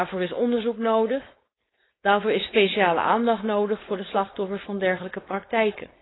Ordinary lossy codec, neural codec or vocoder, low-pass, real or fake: AAC, 16 kbps; codec, 16 kHz, 4.8 kbps, FACodec; 7.2 kHz; fake